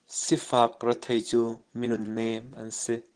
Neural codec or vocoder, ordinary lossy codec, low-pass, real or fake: vocoder, 22.05 kHz, 80 mel bands, Vocos; Opus, 16 kbps; 9.9 kHz; fake